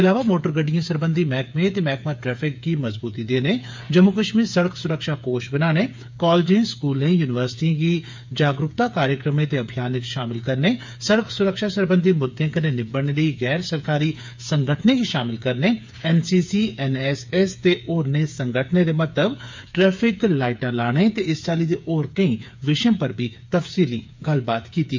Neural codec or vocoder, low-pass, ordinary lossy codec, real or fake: codec, 16 kHz, 8 kbps, FreqCodec, smaller model; 7.2 kHz; MP3, 64 kbps; fake